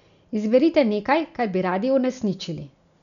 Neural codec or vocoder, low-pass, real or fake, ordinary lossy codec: none; 7.2 kHz; real; none